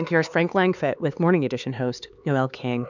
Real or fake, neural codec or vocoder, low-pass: fake; codec, 16 kHz, 4 kbps, X-Codec, HuBERT features, trained on LibriSpeech; 7.2 kHz